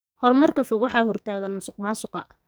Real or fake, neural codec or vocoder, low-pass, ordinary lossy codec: fake; codec, 44.1 kHz, 2.6 kbps, SNAC; none; none